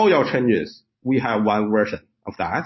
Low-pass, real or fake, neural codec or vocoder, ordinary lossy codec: 7.2 kHz; real; none; MP3, 24 kbps